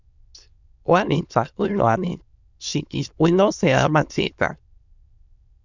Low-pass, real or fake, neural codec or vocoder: 7.2 kHz; fake; autoencoder, 22.05 kHz, a latent of 192 numbers a frame, VITS, trained on many speakers